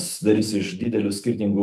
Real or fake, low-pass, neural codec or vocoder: fake; 14.4 kHz; autoencoder, 48 kHz, 128 numbers a frame, DAC-VAE, trained on Japanese speech